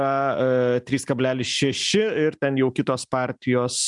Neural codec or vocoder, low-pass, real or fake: none; 10.8 kHz; real